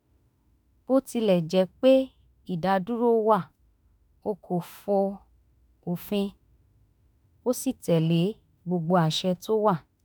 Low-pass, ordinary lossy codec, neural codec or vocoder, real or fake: none; none; autoencoder, 48 kHz, 32 numbers a frame, DAC-VAE, trained on Japanese speech; fake